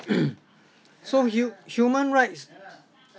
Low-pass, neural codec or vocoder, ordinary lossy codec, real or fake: none; none; none; real